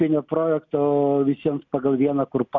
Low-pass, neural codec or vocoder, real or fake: 7.2 kHz; none; real